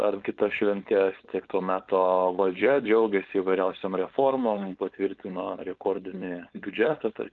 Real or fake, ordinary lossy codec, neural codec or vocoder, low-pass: fake; Opus, 32 kbps; codec, 16 kHz, 4.8 kbps, FACodec; 7.2 kHz